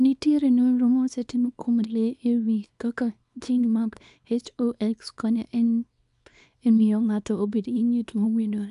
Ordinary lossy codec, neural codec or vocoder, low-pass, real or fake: none; codec, 24 kHz, 0.9 kbps, WavTokenizer, small release; 10.8 kHz; fake